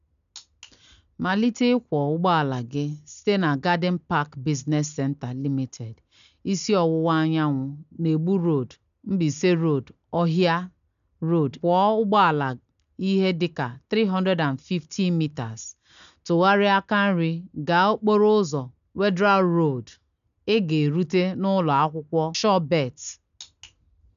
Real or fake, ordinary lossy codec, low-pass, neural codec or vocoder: real; none; 7.2 kHz; none